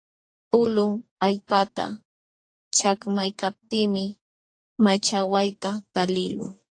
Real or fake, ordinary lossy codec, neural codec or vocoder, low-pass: fake; AAC, 48 kbps; codec, 44.1 kHz, 2.6 kbps, DAC; 9.9 kHz